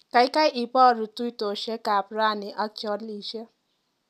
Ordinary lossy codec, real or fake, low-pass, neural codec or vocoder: none; real; 14.4 kHz; none